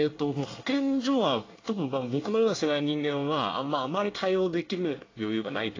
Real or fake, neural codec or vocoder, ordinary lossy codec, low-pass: fake; codec, 24 kHz, 1 kbps, SNAC; AAC, 32 kbps; 7.2 kHz